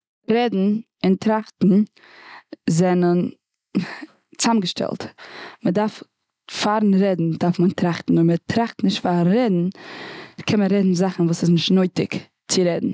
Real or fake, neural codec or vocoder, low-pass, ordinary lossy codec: real; none; none; none